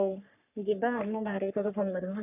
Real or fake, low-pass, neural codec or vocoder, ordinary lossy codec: fake; 3.6 kHz; codec, 44.1 kHz, 3.4 kbps, Pupu-Codec; none